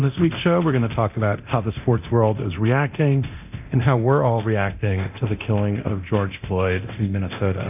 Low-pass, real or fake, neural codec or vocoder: 3.6 kHz; fake; codec, 24 kHz, 0.9 kbps, DualCodec